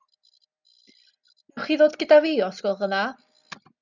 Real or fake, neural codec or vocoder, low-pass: real; none; 7.2 kHz